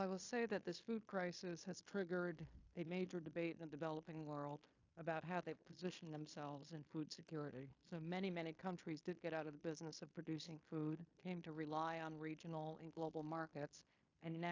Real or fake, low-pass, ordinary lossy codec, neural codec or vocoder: fake; 7.2 kHz; AAC, 48 kbps; codec, 16 kHz in and 24 kHz out, 0.9 kbps, LongCat-Audio-Codec, fine tuned four codebook decoder